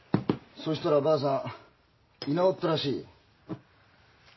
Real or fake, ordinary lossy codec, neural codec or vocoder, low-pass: real; MP3, 24 kbps; none; 7.2 kHz